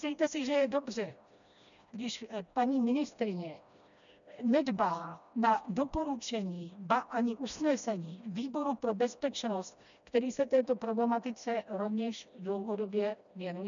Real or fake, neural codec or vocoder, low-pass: fake; codec, 16 kHz, 1 kbps, FreqCodec, smaller model; 7.2 kHz